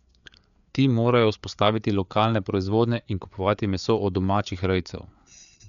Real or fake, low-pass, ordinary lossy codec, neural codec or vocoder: fake; 7.2 kHz; none; codec, 16 kHz, 4 kbps, FreqCodec, larger model